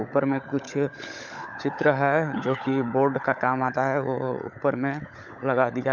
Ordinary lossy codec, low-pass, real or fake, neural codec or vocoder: none; 7.2 kHz; fake; codec, 16 kHz, 16 kbps, FunCodec, trained on LibriTTS, 50 frames a second